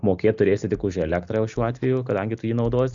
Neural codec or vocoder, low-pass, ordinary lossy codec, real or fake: none; 7.2 kHz; Opus, 64 kbps; real